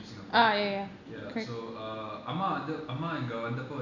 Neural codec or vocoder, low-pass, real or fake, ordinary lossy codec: none; 7.2 kHz; real; none